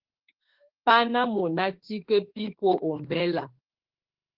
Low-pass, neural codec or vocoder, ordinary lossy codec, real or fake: 5.4 kHz; autoencoder, 48 kHz, 32 numbers a frame, DAC-VAE, trained on Japanese speech; Opus, 16 kbps; fake